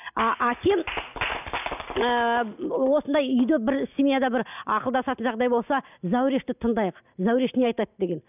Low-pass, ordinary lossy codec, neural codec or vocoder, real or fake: 3.6 kHz; none; none; real